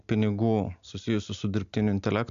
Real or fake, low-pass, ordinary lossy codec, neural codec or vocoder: real; 7.2 kHz; AAC, 64 kbps; none